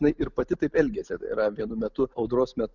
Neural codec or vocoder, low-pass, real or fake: none; 7.2 kHz; real